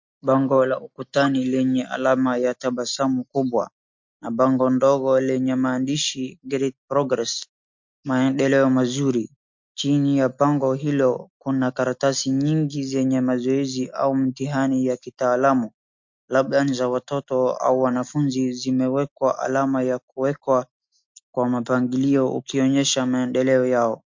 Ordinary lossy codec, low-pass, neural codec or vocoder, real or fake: MP3, 48 kbps; 7.2 kHz; none; real